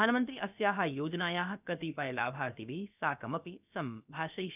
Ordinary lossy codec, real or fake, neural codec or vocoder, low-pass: none; fake; codec, 16 kHz, about 1 kbps, DyCAST, with the encoder's durations; 3.6 kHz